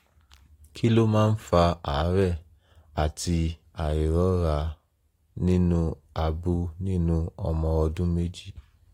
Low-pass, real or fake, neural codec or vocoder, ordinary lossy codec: 19.8 kHz; real; none; AAC, 48 kbps